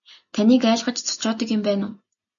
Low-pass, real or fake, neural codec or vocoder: 7.2 kHz; real; none